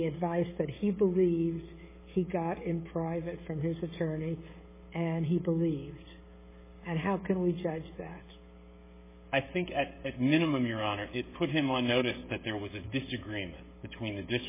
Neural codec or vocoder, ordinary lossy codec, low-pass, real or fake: codec, 16 kHz, 16 kbps, FreqCodec, smaller model; MP3, 16 kbps; 3.6 kHz; fake